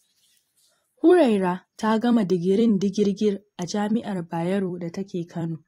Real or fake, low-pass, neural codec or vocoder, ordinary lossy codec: fake; 19.8 kHz; vocoder, 44.1 kHz, 128 mel bands every 256 samples, BigVGAN v2; AAC, 48 kbps